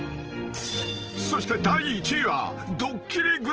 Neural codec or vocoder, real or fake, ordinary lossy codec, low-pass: none; real; Opus, 16 kbps; 7.2 kHz